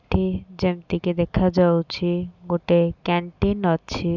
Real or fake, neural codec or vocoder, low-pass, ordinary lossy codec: real; none; 7.2 kHz; none